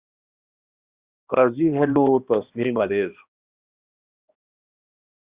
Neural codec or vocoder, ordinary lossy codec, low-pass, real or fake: codec, 16 kHz, 1 kbps, X-Codec, HuBERT features, trained on balanced general audio; Opus, 64 kbps; 3.6 kHz; fake